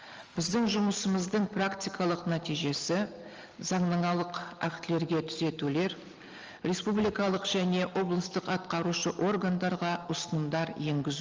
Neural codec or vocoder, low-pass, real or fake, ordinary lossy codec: none; 7.2 kHz; real; Opus, 24 kbps